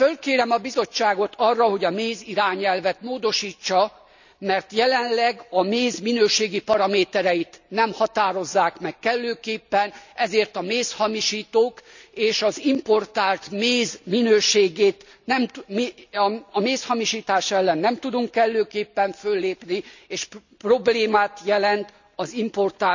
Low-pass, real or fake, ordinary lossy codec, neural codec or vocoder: 7.2 kHz; real; none; none